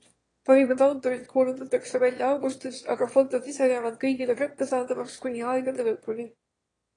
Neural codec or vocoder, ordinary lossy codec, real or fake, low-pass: autoencoder, 22.05 kHz, a latent of 192 numbers a frame, VITS, trained on one speaker; AAC, 32 kbps; fake; 9.9 kHz